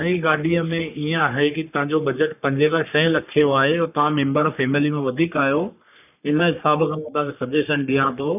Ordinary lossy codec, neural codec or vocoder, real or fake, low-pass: none; codec, 44.1 kHz, 3.4 kbps, Pupu-Codec; fake; 3.6 kHz